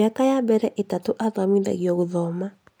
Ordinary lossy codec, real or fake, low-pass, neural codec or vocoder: none; real; none; none